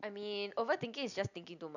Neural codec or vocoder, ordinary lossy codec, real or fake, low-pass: none; none; real; 7.2 kHz